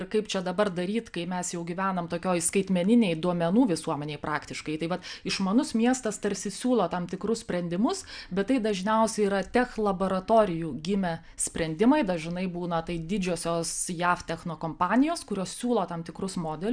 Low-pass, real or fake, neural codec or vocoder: 9.9 kHz; real; none